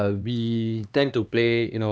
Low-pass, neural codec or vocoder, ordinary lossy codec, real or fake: none; codec, 16 kHz, 2 kbps, X-Codec, HuBERT features, trained on LibriSpeech; none; fake